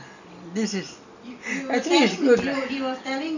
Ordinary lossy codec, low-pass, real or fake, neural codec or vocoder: none; 7.2 kHz; real; none